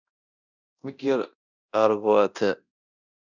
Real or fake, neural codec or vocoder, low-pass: fake; codec, 24 kHz, 0.9 kbps, DualCodec; 7.2 kHz